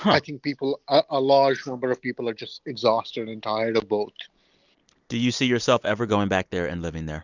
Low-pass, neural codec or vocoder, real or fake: 7.2 kHz; none; real